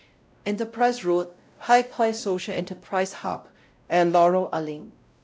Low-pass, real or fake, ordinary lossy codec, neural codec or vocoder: none; fake; none; codec, 16 kHz, 0.5 kbps, X-Codec, WavLM features, trained on Multilingual LibriSpeech